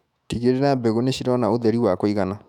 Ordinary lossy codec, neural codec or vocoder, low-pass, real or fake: none; autoencoder, 48 kHz, 128 numbers a frame, DAC-VAE, trained on Japanese speech; 19.8 kHz; fake